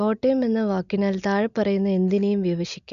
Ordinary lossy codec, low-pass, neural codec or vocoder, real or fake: none; 7.2 kHz; none; real